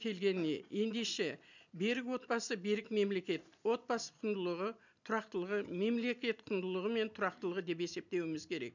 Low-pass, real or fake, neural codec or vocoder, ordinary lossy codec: 7.2 kHz; real; none; none